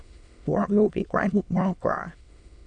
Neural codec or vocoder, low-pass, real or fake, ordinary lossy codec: autoencoder, 22.05 kHz, a latent of 192 numbers a frame, VITS, trained on many speakers; 9.9 kHz; fake; none